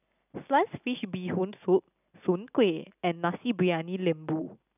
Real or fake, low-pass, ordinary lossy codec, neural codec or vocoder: real; 3.6 kHz; none; none